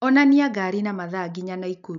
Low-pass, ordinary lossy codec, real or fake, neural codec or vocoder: 7.2 kHz; MP3, 96 kbps; real; none